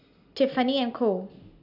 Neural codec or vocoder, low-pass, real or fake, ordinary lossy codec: none; 5.4 kHz; real; none